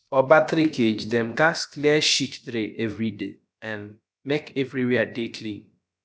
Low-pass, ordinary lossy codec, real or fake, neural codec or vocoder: none; none; fake; codec, 16 kHz, about 1 kbps, DyCAST, with the encoder's durations